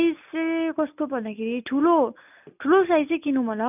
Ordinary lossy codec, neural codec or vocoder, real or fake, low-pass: none; none; real; 3.6 kHz